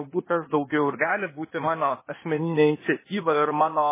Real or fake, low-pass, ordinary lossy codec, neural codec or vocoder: fake; 3.6 kHz; MP3, 16 kbps; codec, 16 kHz, 0.8 kbps, ZipCodec